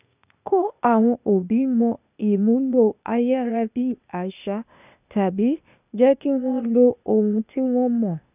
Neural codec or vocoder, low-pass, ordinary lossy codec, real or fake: codec, 16 kHz, 0.8 kbps, ZipCodec; 3.6 kHz; none; fake